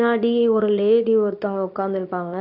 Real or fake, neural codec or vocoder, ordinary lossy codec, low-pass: fake; codec, 24 kHz, 0.9 kbps, WavTokenizer, medium speech release version 2; none; 5.4 kHz